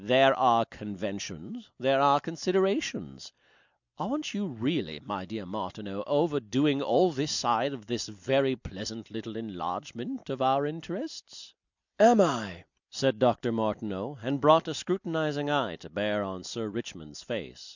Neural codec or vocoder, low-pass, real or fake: none; 7.2 kHz; real